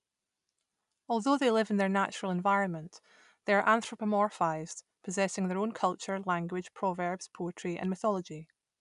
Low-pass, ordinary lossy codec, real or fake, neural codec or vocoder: 10.8 kHz; none; real; none